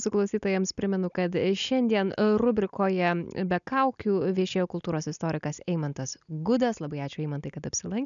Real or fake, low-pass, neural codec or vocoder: real; 7.2 kHz; none